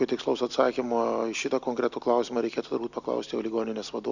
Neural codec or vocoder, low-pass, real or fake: none; 7.2 kHz; real